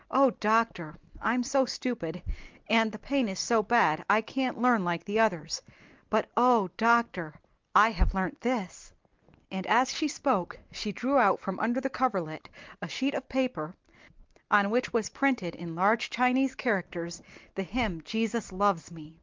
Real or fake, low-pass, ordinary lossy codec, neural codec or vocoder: real; 7.2 kHz; Opus, 32 kbps; none